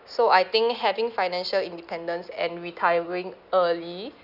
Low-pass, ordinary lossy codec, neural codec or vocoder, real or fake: 5.4 kHz; none; none; real